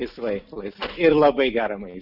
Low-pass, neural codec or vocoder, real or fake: 5.4 kHz; none; real